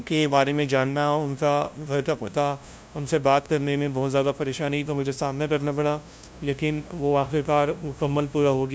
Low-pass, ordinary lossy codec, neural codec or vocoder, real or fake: none; none; codec, 16 kHz, 0.5 kbps, FunCodec, trained on LibriTTS, 25 frames a second; fake